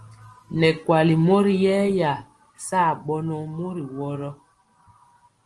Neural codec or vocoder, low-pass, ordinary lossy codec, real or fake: none; 10.8 kHz; Opus, 24 kbps; real